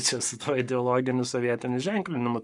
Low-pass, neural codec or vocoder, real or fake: 10.8 kHz; codec, 44.1 kHz, 7.8 kbps, Pupu-Codec; fake